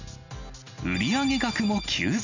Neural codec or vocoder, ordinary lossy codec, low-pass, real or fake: none; none; 7.2 kHz; real